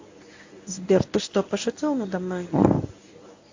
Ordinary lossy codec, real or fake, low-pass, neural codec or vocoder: AAC, 48 kbps; fake; 7.2 kHz; codec, 24 kHz, 0.9 kbps, WavTokenizer, medium speech release version 1